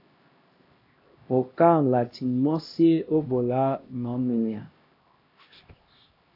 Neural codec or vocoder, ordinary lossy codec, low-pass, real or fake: codec, 16 kHz, 1 kbps, X-Codec, HuBERT features, trained on LibriSpeech; AAC, 32 kbps; 5.4 kHz; fake